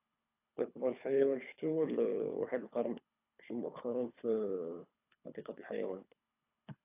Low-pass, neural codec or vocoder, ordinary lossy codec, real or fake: 3.6 kHz; codec, 24 kHz, 3 kbps, HILCodec; AAC, 32 kbps; fake